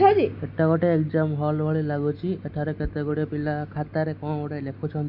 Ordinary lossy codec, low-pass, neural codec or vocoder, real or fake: none; 5.4 kHz; none; real